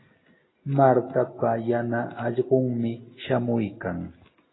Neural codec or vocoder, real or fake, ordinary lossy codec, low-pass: none; real; AAC, 16 kbps; 7.2 kHz